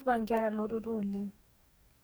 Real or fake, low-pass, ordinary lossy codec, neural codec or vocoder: fake; none; none; codec, 44.1 kHz, 2.6 kbps, DAC